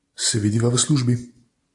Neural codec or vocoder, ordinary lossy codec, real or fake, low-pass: none; AAC, 64 kbps; real; 10.8 kHz